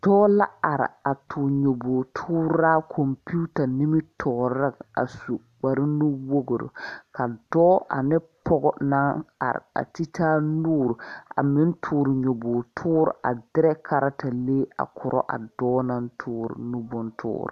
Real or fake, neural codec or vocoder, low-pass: real; none; 14.4 kHz